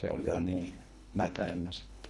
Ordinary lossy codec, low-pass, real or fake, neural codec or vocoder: none; none; fake; codec, 24 kHz, 1.5 kbps, HILCodec